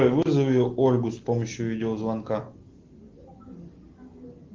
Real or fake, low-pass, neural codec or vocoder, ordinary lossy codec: real; 7.2 kHz; none; Opus, 16 kbps